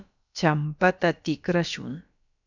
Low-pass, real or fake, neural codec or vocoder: 7.2 kHz; fake; codec, 16 kHz, about 1 kbps, DyCAST, with the encoder's durations